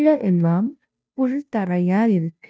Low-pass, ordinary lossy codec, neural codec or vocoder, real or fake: none; none; codec, 16 kHz, 0.5 kbps, FunCodec, trained on Chinese and English, 25 frames a second; fake